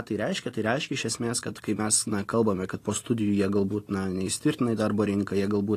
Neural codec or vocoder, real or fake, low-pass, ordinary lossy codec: none; real; 14.4 kHz; AAC, 48 kbps